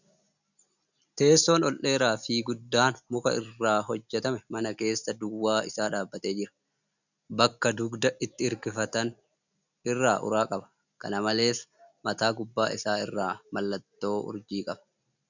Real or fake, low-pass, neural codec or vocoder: real; 7.2 kHz; none